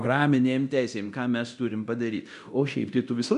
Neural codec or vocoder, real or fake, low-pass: codec, 24 kHz, 0.9 kbps, DualCodec; fake; 10.8 kHz